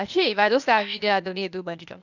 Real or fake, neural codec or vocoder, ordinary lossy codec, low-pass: fake; codec, 16 kHz, 0.8 kbps, ZipCodec; none; 7.2 kHz